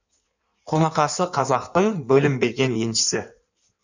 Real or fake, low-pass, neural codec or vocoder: fake; 7.2 kHz; codec, 16 kHz in and 24 kHz out, 1.1 kbps, FireRedTTS-2 codec